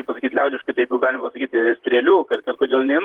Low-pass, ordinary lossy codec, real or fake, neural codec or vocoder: 19.8 kHz; Opus, 24 kbps; fake; vocoder, 44.1 kHz, 128 mel bands, Pupu-Vocoder